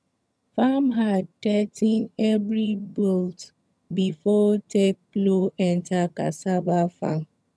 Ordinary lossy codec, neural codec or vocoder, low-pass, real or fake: none; vocoder, 22.05 kHz, 80 mel bands, HiFi-GAN; none; fake